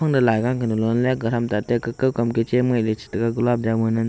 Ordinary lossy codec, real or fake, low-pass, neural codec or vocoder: none; real; none; none